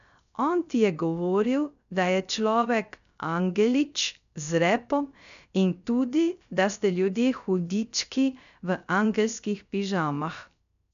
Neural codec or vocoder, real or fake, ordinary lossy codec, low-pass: codec, 16 kHz, 0.3 kbps, FocalCodec; fake; none; 7.2 kHz